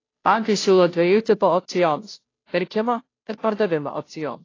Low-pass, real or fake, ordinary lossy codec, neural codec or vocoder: 7.2 kHz; fake; AAC, 32 kbps; codec, 16 kHz, 0.5 kbps, FunCodec, trained on Chinese and English, 25 frames a second